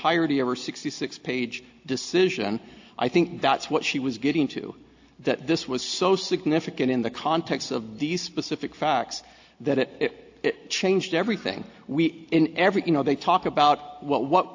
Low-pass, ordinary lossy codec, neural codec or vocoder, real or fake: 7.2 kHz; AAC, 48 kbps; none; real